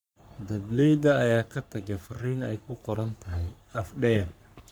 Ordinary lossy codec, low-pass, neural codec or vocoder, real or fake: none; none; codec, 44.1 kHz, 3.4 kbps, Pupu-Codec; fake